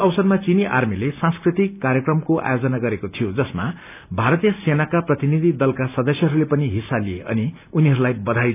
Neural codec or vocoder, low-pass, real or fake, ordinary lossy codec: none; 3.6 kHz; real; none